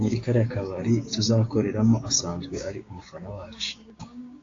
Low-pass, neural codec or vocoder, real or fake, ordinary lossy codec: 7.2 kHz; codec, 16 kHz, 6 kbps, DAC; fake; AAC, 32 kbps